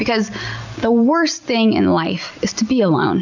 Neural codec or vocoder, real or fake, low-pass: none; real; 7.2 kHz